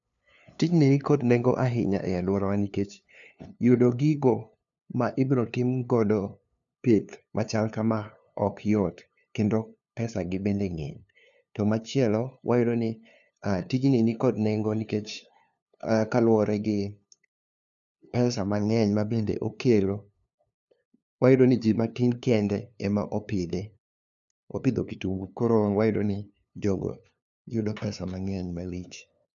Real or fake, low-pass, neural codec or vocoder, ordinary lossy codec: fake; 7.2 kHz; codec, 16 kHz, 2 kbps, FunCodec, trained on LibriTTS, 25 frames a second; none